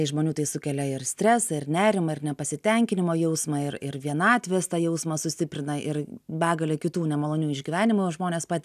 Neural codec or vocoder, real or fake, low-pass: none; real; 14.4 kHz